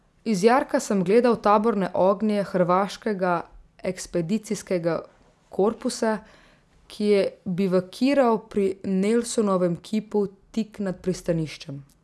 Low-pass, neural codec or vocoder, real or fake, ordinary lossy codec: none; none; real; none